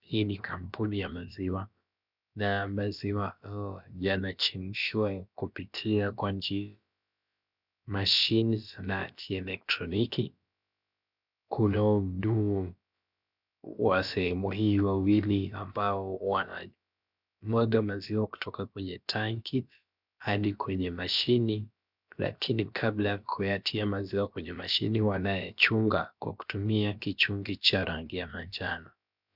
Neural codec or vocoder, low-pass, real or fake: codec, 16 kHz, about 1 kbps, DyCAST, with the encoder's durations; 5.4 kHz; fake